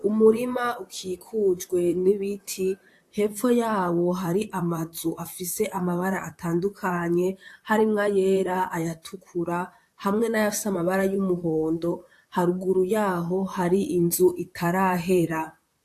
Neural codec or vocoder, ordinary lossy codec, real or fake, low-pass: vocoder, 44.1 kHz, 128 mel bands, Pupu-Vocoder; AAC, 64 kbps; fake; 14.4 kHz